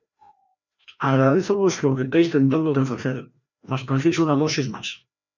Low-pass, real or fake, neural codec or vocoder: 7.2 kHz; fake; codec, 16 kHz, 1 kbps, FreqCodec, larger model